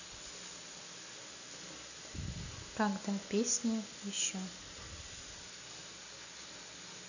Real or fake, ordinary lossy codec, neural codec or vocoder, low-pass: real; none; none; 7.2 kHz